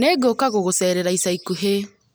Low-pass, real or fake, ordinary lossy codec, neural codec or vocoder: none; real; none; none